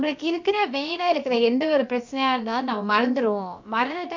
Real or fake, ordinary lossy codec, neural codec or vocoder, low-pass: fake; MP3, 64 kbps; codec, 16 kHz, about 1 kbps, DyCAST, with the encoder's durations; 7.2 kHz